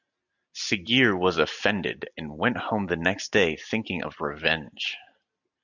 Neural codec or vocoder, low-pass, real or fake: none; 7.2 kHz; real